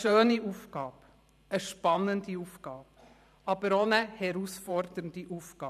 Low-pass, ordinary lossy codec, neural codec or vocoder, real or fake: 14.4 kHz; none; vocoder, 44.1 kHz, 128 mel bands every 512 samples, BigVGAN v2; fake